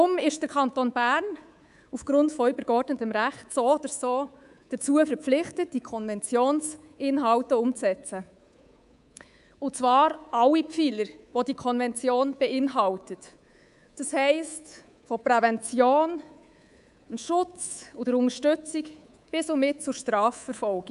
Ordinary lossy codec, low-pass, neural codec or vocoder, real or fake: none; 10.8 kHz; codec, 24 kHz, 3.1 kbps, DualCodec; fake